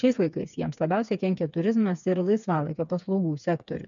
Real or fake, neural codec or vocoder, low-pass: fake; codec, 16 kHz, 4 kbps, FreqCodec, smaller model; 7.2 kHz